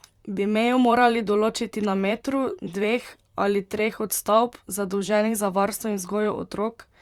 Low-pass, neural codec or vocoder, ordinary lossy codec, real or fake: 19.8 kHz; vocoder, 44.1 kHz, 128 mel bands, Pupu-Vocoder; Opus, 64 kbps; fake